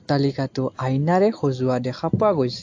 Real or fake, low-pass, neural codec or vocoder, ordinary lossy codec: real; 7.2 kHz; none; AAC, 48 kbps